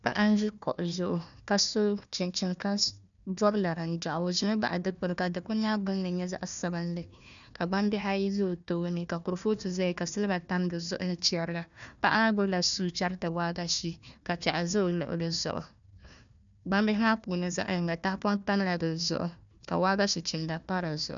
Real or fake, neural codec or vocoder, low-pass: fake; codec, 16 kHz, 1 kbps, FunCodec, trained on Chinese and English, 50 frames a second; 7.2 kHz